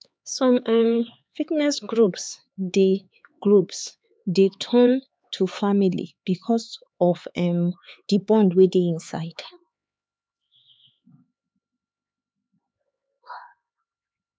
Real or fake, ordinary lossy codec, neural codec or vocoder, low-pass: fake; none; codec, 16 kHz, 4 kbps, X-Codec, HuBERT features, trained on LibriSpeech; none